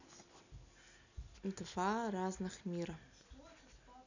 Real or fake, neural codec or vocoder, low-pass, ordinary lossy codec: real; none; 7.2 kHz; none